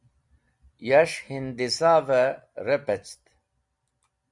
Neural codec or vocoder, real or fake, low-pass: none; real; 10.8 kHz